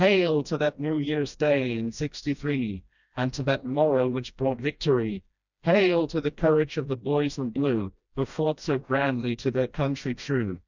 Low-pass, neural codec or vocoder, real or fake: 7.2 kHz; codec, 16 kHz, 1 kbps, FreqCodec, smaller model; fake